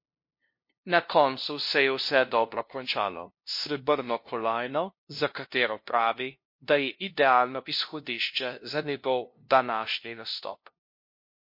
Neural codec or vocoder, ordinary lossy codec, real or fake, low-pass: codec, 16 kHz, 0.5 kbps, FunCodec, trained on LibriTTS, 25 frames a second; MP3, 32 kbps; fake; 5.4 kHz